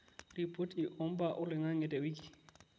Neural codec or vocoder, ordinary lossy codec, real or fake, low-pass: none; none; real; none